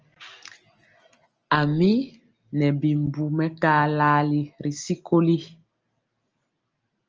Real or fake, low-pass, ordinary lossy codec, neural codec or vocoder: real; 7.2 kHz; Opus, 24 kbps; none